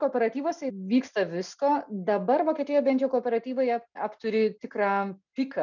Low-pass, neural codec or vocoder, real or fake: 7.2 kHz; none; real